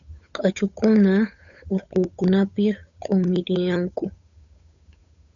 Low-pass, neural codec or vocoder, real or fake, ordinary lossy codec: 7.2 kHz; codec, 16 kHz, 8 kbps, FunCodec, trained on Chinese and English, 25 frames a second; fake; MP3, 96 kbps